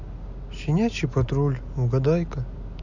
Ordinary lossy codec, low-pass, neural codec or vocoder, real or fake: none; 7.2 kHz; none; real